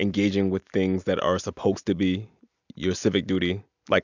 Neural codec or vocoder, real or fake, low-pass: none; real; 7.2 kHz